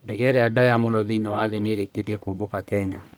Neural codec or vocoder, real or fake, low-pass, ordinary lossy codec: codec, 44.1 kHz, 1.7 kbps, Pupu-Codec; fake; none; none